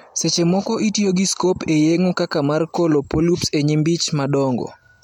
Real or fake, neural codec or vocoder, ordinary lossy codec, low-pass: real; none; MP3, 96 kbps; 19.8 kHz